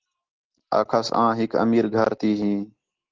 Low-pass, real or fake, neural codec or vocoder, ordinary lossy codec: 7.2 kHz; real; none; Opus, 16 kbps